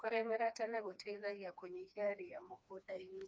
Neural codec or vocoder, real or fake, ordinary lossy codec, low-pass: codec, 16 kHz, 2 kbps, FreqCodec, smaller model; fake; none; none